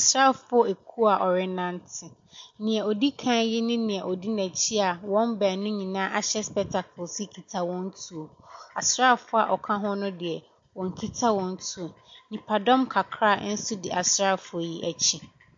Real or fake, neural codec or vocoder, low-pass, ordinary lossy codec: real; none; 7.2 kHz; MP3, 48 kbps